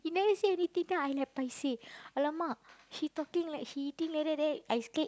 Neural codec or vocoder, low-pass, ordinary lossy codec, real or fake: none; none; none; real